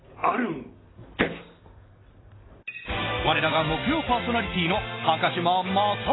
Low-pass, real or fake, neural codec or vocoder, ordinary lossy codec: 7.2 kHz; real; none; AAC, 16 kbps